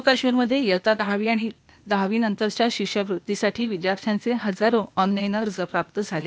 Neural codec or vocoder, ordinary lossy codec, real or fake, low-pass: codec, 16 kHz, 0.8 kbps, ZipCodec; none; fake; none